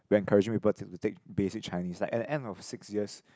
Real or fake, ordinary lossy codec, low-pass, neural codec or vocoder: real; none; none; none